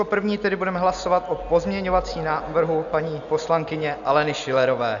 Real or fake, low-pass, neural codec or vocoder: real; 7.2 kHz; none